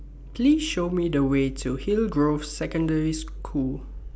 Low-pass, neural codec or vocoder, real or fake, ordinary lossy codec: none; none; real; none